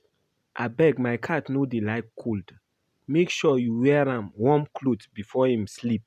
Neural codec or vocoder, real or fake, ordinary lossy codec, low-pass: none; real; none; 14.4 kHz